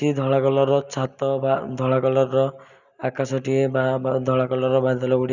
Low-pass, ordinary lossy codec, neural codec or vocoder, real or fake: 7.2 kHz; none; none; real